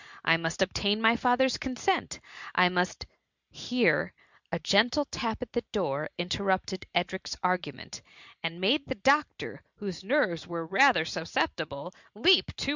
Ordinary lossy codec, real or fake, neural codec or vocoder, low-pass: Opus, 64 kbps; real; none; 7.2 kHz